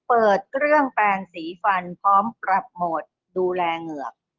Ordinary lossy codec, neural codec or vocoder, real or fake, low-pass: Opus, 16 kbps; none; real; 7.2 kHz